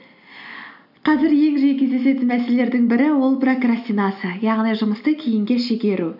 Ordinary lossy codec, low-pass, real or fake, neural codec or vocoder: none; 5.4 kHz; real; none